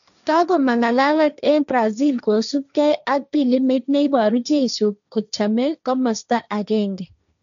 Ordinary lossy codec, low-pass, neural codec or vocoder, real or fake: none; 7.2 kHz; codec, 16 kHz, 1.1 kbps, Voila-Tokenizer; fake